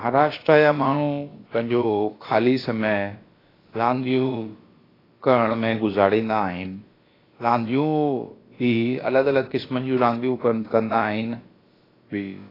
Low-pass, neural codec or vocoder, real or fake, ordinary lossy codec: 5.4 kHz; codec, 16 kHz, about 1 kbps, DyCAST, with the encoder's durations; fake; AAC, 24 kbps